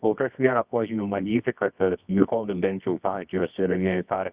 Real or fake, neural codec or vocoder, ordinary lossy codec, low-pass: fake; codec, 24 kHz, 0.9 kbps, WavTokenizer, medium music audio release; Opus, 64 kbps; 3.6 kHz